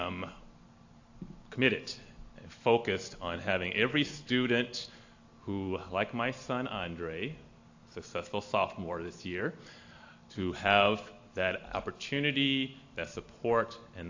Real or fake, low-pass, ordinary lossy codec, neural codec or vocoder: real; 7.2 kHz; AAC, 48 kbps; none